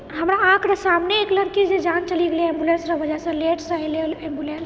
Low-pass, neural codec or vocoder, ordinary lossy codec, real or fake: none; none; none; real